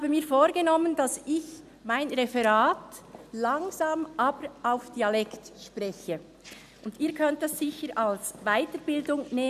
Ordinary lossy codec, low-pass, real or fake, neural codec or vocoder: none; 14.4 kHz; real; none